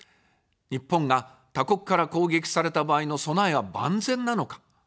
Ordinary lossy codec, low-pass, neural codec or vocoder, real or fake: none; none; none; real